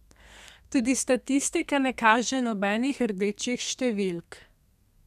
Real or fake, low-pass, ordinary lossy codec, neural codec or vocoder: fake; 14.4 kHz; none; codec, 32 kHz, 1.9 kbps, SNAC